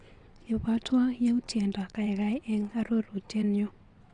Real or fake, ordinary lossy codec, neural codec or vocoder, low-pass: fake; Opus, 64 kbps; vocoder, 22.05 kHz, 80 mel bands, WaveNeXt; 9.9 kHz